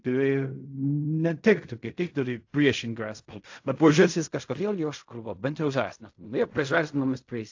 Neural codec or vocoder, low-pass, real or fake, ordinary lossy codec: codec, 16 kHz in and 24 kHz out, 0.4 kbps, LongCat-Audio-Codec, fine tuned four codebook decoder; 7.2 kHz; fake; AAC, 48 kbps